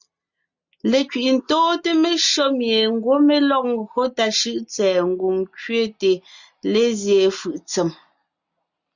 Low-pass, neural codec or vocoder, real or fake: 7.2 kHz; none; real